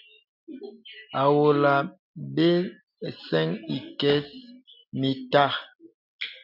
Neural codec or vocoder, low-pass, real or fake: none; 5.4 kHz; real